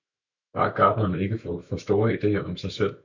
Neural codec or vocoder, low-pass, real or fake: autoencoder, 48 kHz, 128 numbers a frame, DAC-VAE, trained on Japanese speech; 7.2 kHz; fake